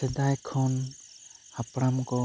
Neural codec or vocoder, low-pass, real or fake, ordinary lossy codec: none; none; real; none